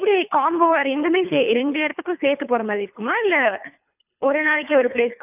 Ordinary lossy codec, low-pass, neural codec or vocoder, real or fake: none; 3.6 kHz; codec, 24 kHz, 3 kbps, HILCodec; fake